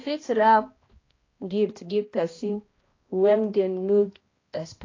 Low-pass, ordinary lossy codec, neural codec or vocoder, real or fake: 7.2 kHz; AAC, 32 kbps; codec, 16 kHz, 1 kbps, X-Codec, HuBERT features, trained on balanced general audio; fake